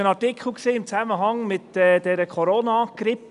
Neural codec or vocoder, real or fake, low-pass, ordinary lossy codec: none; real; 10.8 kHz; none